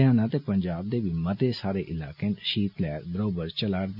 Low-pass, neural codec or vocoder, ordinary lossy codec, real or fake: 5.4 kHz; none; none; real